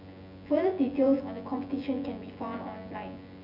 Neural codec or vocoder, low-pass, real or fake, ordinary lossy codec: vocoder, 24 kHz, 100 mel bands, Vocos; 5.4 kHz; fake; none